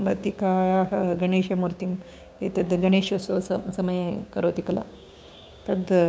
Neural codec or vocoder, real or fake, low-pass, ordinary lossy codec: codec, 16 kHz, 6 kbps, DAC; fake; none; none